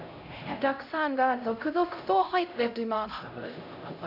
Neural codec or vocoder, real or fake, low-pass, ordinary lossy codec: codec, 16 kHz, 0.5 kbps, X-Codec, HuBERT features, trained on LibriSpeech; fake; 5.4 kHz; none